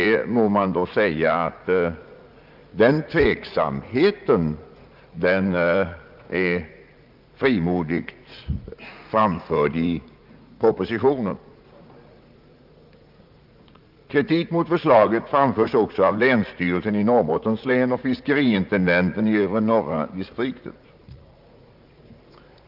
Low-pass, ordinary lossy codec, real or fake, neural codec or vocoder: 5.4 kHz; Opus, 24 kbps; fake; vocoder, 44.1 kHz, 80 mel bands, Vocos